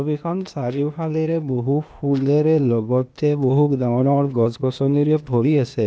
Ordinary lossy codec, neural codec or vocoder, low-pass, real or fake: none; codec, 16 kHz, 0.8 kbps, ZipCodec; none; fake